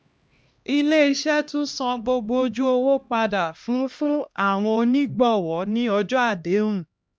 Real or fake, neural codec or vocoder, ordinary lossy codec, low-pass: fake; codec, 16 kHz, 1 kbps, X-Codec, HuBERT features, trained on LibriSpeech; none; none